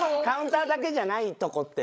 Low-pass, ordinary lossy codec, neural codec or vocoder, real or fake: none; none; codec, 16 kHz, 16 kbps, FreqCodec, larger model; fake